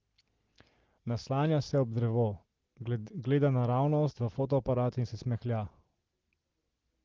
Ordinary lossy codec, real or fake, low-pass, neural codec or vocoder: Opus, 16 kbps; real; 7.2 kHz; none